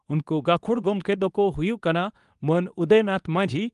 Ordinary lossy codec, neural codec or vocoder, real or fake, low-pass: Opus, 32 kbps; codec, 24 kHz, 0.9 kbps, WavTokenizer, medium speech release version 2; fake; 10.8 kHz